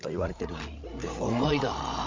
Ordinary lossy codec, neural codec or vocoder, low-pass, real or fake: MP3, 48 kbps; codec, 16 kHz, 16 kbps, FreqCodec, larger model; 7.2 kHz; fake